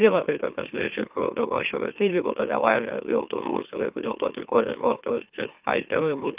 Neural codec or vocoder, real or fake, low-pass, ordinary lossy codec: autoencoder, 44.1 kHz, a latent of 192 numbers a frame, MeloTTS; fake; 3.6 kHz; Opus, 24 kbps